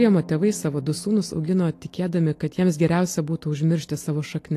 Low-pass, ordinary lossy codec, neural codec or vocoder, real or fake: 14.4 kHz; AAC, 48 kbps; none; real